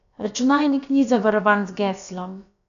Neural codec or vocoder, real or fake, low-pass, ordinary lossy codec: codec, 16 kHz, about 1 kbps, DyCAST, with the encoder's durations; fake; 7.2 kHz; MP3, 96 kbps